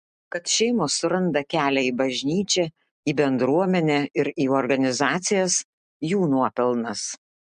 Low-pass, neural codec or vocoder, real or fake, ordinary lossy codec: 9.9 kHz; none; real; MP3, 64 kbps